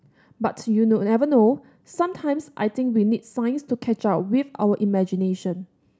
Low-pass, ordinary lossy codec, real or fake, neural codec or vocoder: none; none; real; none